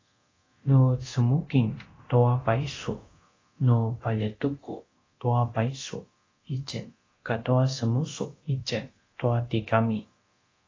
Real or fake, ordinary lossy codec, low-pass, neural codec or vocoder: fake; AAC, 32 kbps; 7.2 kHz; codec, 24 kHz, 0.9 kbps, DualCodec